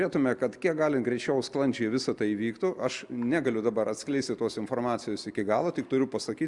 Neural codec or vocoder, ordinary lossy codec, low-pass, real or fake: none; Opus, 64 kbps; 10.8 kHz; real